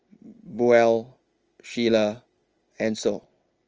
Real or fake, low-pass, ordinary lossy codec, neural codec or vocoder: real; 7.2 kHz; Opus, 24 kbps; none